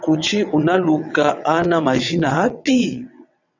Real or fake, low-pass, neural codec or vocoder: fake; 7.2 kHz; vocoder, 22.05 kHz, 80 mel bands, WaveNeXt